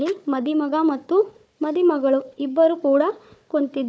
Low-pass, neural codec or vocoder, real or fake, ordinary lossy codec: none; codec, 16 kHz, 4 kbps, FunCodec, trained on Chinese and English, 50 frames a second; fake; none